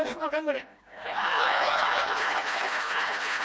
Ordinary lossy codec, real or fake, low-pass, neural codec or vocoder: none; fake; none; codec, 16 kHz, 1 kbps, FreqCodec, smaller model